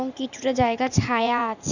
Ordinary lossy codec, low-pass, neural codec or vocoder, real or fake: none; 7.2 kHz; vocoder, 44.1 kHz, 80 mel bands, Vocos; fake